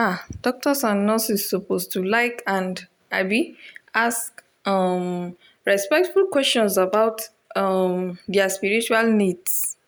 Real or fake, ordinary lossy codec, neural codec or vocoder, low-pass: real; none; none; none